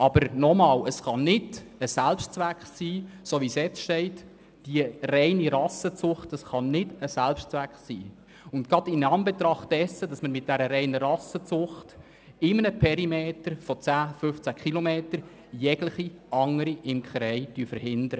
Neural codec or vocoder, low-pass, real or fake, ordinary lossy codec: none; none; real; none